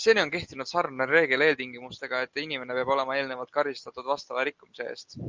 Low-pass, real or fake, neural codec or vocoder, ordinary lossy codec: 7.2 kHz; real; none; Opus, 24 kbps